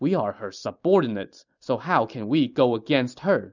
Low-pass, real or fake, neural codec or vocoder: 7.2 kHz; real; none